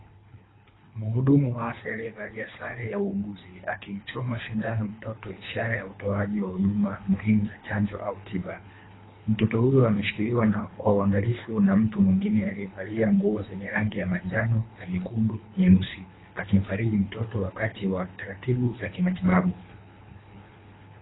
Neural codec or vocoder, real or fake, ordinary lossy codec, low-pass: codec, 24 kHz, 3 kbps, HILCodec; fake; AAC, 16 kbps; 7.2 kHz